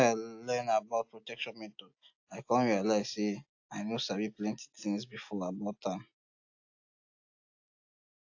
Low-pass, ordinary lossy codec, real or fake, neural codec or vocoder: 7.2 kHz; AAC, 48 kbps; real; none